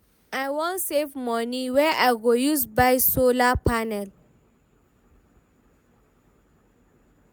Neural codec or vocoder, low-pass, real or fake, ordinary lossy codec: none; none; real; none